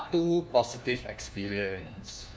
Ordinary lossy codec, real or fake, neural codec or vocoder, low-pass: none; fake; codec, 16 kHz, 1 kbps, FunCodec, trained on LibriTTS, 50 frames a second; none